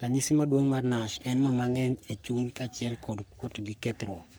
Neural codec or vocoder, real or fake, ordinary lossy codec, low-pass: codec, 44.1 kHz, 3.4 kbps, Pupu-Codec; fake; none; none